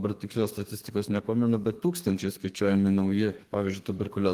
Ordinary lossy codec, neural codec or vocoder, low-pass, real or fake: Opus, 24 kbps; codec, 32 kHz, 1.9 kbps, SNAC; 14.4 kHz; fake